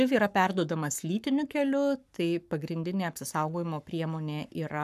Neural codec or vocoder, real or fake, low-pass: codec, 44.1 kHz, 7.8 kbps, Pupu-Codec; fake; 14.4 kHz